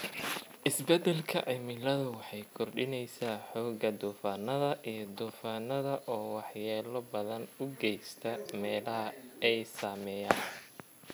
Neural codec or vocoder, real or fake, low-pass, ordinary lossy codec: none; real; none; none